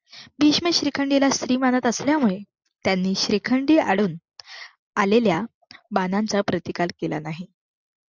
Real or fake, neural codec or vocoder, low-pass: real; none; 7.2 kHz